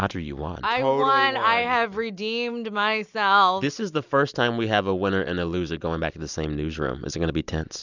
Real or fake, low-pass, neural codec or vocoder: real; 7.2 kHz; none